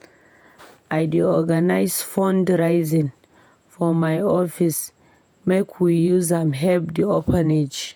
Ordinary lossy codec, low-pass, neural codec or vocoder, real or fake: none; none; vocoder, 48 kHz, 128 mel bands, Vocos; fake